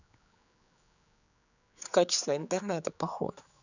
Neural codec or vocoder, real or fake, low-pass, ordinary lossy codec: codec, 16 kHz, 4 kbps, X-Codec, HuBERT features, trained on general audio; fake; 7.2 kHz; none